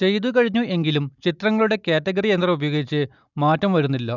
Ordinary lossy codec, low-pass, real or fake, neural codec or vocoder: none; 7.2 kHz; real; none